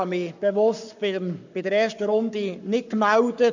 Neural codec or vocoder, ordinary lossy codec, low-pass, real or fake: codec, 44.1 kHz, 7.8 kbps, Pupu-Codec; none; 7.2 kHz; fake